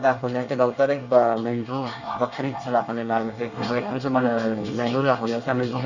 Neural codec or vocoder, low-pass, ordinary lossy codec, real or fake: codec, 24 kHz, 1 kbps, SNAC; 7.2 kHz; none; fake